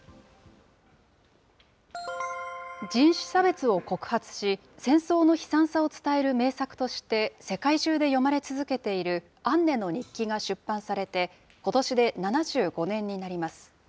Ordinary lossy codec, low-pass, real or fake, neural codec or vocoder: none; none; real; none